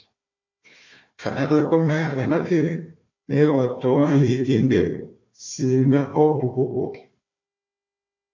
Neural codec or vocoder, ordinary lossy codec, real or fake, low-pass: codec, 16 kHz, 1 kbps, FunCodec, trained on Chinese and English, 50 frames a second; MP3, 48 kbps; fake; 7.2 kHz